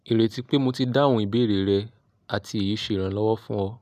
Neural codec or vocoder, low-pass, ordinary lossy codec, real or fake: none; 14.4 kHz; none; real